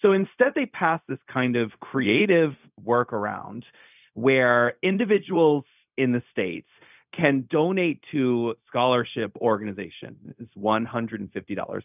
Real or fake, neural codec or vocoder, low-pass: fake; codec, 16 kHz, 0.4 kbps, LongCat-Audio-Codec; 3.6 kHz